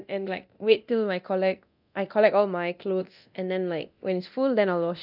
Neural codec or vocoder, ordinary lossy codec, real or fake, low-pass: codec, 24 kHz, 0.9 kbps, DualCodec; none; fake; 5.4 kHz